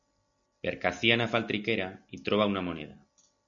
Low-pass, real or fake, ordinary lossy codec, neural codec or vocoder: 7.2 kHz; real; MP3, 96 kbps; none